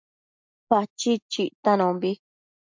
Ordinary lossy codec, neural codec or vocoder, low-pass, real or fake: MP3, 64 kbps; none; 7.2 kHz; real